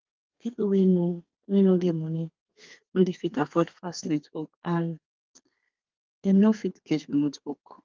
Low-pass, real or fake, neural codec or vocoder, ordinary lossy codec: 7.2 kHz; fake; codec, 32 kHz, 1.9 kbps, SNAC; Opus, 32 kbps